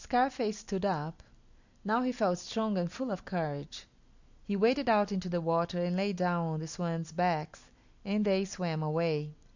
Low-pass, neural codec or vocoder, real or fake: 7.2 kHz; none; real